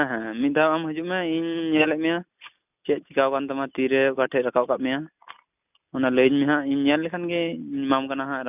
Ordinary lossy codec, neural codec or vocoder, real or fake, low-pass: none; none; real; 3.6 kHz